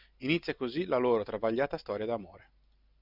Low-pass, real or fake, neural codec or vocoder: 5.4 kHz; real; none